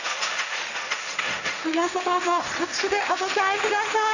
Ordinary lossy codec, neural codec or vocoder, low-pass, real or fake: none; codec, 16 kHz, 1.1 kbps, Voila-Tokenizer; 7.2 kHz; fake